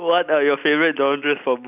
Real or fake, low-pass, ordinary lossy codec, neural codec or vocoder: real; 3.6 kHz; none; none